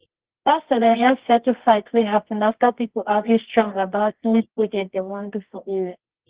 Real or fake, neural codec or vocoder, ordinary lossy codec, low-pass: fake; codec, 24 kHz, 0.9 kbps, WavTokenizer, medium music audio release; Opus, 16 kbps; 3.6 kHz